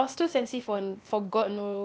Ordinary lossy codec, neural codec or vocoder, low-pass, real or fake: none; codec, 16 kHz, 0.8 kbps, ZipCodec; none; fake